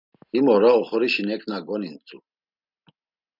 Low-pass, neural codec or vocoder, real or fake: 5.4 kHz; none; real